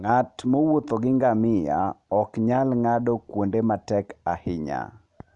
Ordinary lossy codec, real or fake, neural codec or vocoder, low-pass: MP3, 96 kbps; real; none; 10.8 kHz